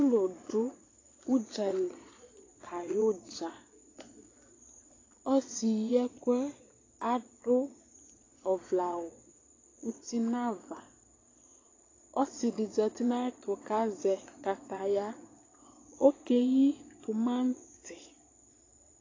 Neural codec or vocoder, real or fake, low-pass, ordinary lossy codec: vocoder, 22.05 kHz, 80 mel bands, Vocos; fake; 7.2 kHz; AAC, 32 kbps